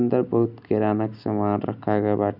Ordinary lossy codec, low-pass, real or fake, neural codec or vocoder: none; 5.4 kHz; real; none